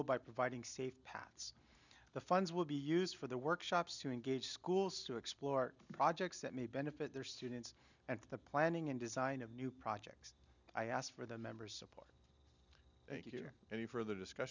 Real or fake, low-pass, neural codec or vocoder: real; 7.2 kHz; none